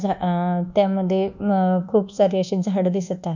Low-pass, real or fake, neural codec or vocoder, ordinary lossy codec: 7.2 kHz; fake; codec, 24 kHz, 1.2 kbps, DualCodec; none